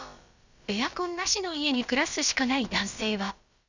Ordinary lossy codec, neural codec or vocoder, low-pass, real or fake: Opus, 64 kbps; codec, 16 kHz, about 1 kbps, DyCAST, with the encoder's durations; 7.2 kHz; fake